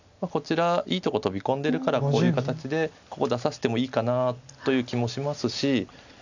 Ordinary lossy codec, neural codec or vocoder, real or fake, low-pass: none; none; real; 7.2 kHz